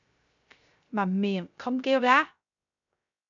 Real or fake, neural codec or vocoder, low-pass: fake; codec, 16 kHz, 0.3 kbps, FocalCodec; 7.2 kHz